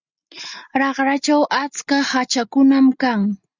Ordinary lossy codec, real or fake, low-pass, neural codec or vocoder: Opus, 64 kbps; real; 7.2 kHz; none